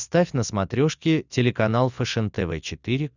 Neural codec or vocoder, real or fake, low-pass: none; real; 7.2 kHz